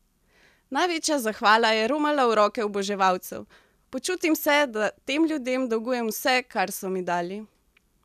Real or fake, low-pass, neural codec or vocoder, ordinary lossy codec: real; 14.4 kHz; none; none